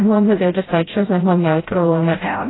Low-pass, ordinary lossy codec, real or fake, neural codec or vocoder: 7.2 kHz; AAC, 16 kbps; fake; codec, 16 kHz, 0.5 kbps, FreqCodec, smaller model